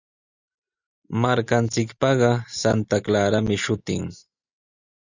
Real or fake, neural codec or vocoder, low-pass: real; none; 7.2 kHz